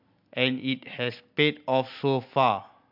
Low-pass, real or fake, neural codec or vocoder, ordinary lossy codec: 5.4 kHz; real; none; MP3, 48 kbps